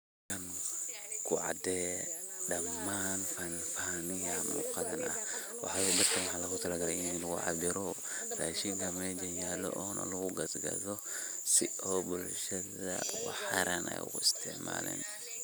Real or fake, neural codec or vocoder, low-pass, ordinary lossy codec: real; none; none; none